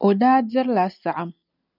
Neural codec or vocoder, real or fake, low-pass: none; real; 5.4 kHz